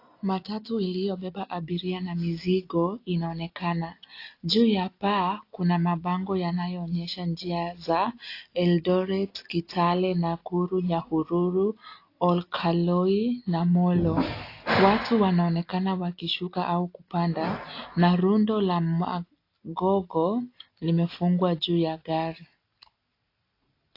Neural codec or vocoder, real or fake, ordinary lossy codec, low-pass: none; real; AAC, 32 kbps; 5.4 kHz